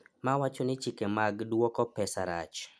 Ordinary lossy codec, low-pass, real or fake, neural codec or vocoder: none; none; real; none